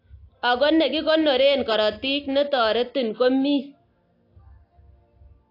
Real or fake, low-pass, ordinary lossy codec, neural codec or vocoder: real; 5.4 kHz; AAC, 32 kbps; none